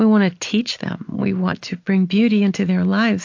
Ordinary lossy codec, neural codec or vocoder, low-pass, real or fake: AAC, 48 kbps; none; 7.2 kHz; real